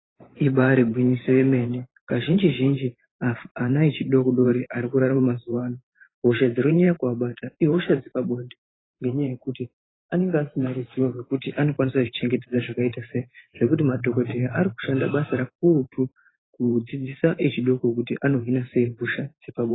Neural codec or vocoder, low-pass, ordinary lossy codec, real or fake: vocoder, 44.1 kHz, 128 mel bands every 512 samples, BigVGAN v2; 7.2 kHz; AAC, 16 kbps; fake